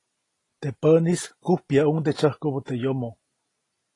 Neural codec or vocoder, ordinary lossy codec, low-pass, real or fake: none; AAC, 32 kbps; 10.8 kHz; real